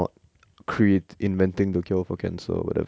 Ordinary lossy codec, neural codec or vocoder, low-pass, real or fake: none; none; none; real